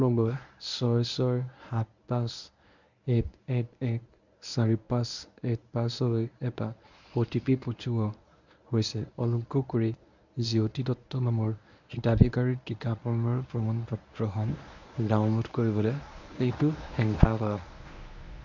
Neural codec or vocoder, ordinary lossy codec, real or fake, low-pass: codec, 24 kHz, 0.9 kbps, WavTokenizer, medium speech release version 1; none; fake; 7.2 kHz